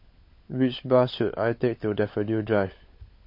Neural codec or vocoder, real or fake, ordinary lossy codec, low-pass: codec, 16 kHz, 16 kbps, FunCodec, trained on LibriTTS, 50 frames a second; fake; MP3, 32 kbps; 5.4 kHz